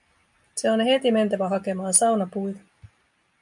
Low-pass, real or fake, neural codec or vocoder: 10.8 kHz; real; none